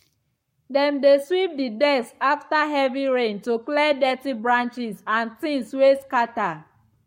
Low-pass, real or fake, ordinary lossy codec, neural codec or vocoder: 19.8 kHz; fake; MP3, 64 kbps; codec, 44.1 kHz, 7.8 kbps, Pupu-Codec